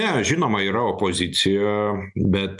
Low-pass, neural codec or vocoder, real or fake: 10.8 kHz; none; real